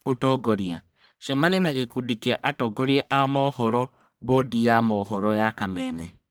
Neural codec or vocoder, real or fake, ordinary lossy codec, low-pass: codec, 44.1 kHz, 1.7 kbps, Pupu-Codec; fake; none; none